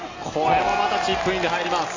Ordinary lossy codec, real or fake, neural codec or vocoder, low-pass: AAC, 32 kbps; real; none; 7.2 kHz